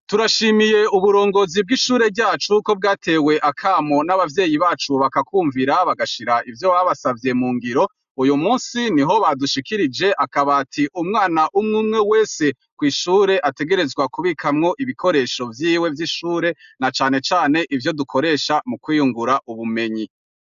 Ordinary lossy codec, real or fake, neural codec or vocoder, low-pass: AAC, 96 kbps; real; none; 7.2 kHz